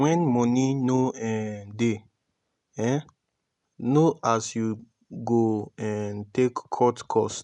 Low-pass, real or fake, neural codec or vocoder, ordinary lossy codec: 10.8 kHz; real; none; none